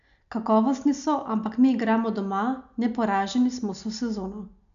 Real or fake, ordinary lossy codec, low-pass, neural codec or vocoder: real; none; 7.2 kHz; none